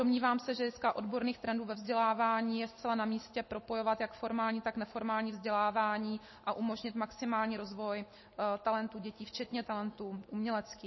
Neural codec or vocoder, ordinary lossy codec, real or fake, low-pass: none; MP3, 24 kbps; real; 7.2 kHz